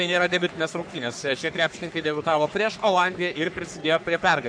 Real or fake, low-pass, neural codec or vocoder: fake; 9.9 kHz; codec, 44.1 kHz, 3.4 kbps, Pupu-Codec